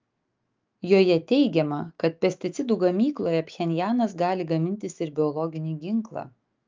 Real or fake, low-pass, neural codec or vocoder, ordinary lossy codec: fake; 7.2 kHz; autoencoder, 48 kHz, 128 numbers a frame, DAC-VAE, trained on Japanese speech; Opus, 24 kbps